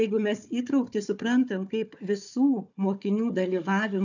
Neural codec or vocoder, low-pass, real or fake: codec, 16 kHz, 4 kbps, FunCodec, trained on Chinese and English, 50 frames a second; 7.2 kHz; fake